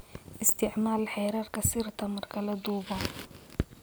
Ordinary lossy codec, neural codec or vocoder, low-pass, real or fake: none; none; none; real